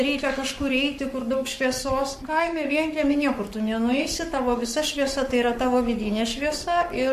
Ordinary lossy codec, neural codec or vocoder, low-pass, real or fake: MP3, 64 kbps; codec, 44.1 kHz, 7.8 kbps, Pupu-Codec; 14.4 kHz; fake